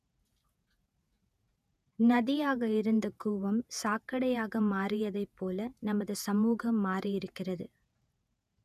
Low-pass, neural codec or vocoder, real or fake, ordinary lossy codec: 14.4 kHz; vocoder, 48 kHz, 128 mel bands, Vocos; fake; none